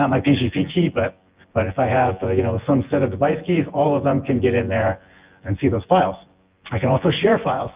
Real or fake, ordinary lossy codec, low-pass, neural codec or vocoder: fake; Opus, 32 kbps; 3.6 kHz; vocoder, 24 kHz, 100 mel bands, Vocos